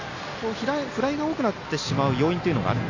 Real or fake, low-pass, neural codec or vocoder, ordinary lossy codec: real; 7.2 kHz; none; AAC, 48 kbps